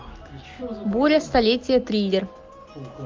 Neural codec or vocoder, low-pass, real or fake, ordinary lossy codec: none; 7.2 kHz; real; Opus, 32 kbps